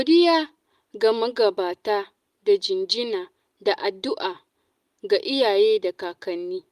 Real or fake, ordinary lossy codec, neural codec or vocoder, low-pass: real; Opus, 32 kbps; none; 14.4 kHz